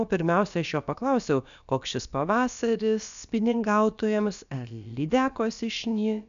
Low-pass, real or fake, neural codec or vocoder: 7.2 kHz; fake; codec, 16 kHz, about 1 kbps, DyCAST, with the encoder's durations